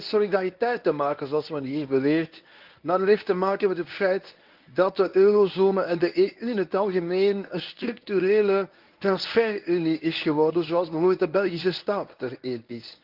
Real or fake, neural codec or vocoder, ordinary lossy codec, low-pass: fake; codec, 24 kHz, 0.9 kbps, WavTokenizer, medium speech release version 1; Opus, 24 kbps; 5.4 kHz